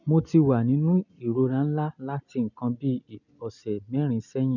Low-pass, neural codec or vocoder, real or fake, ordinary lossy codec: 7.2 kHz; none; real; none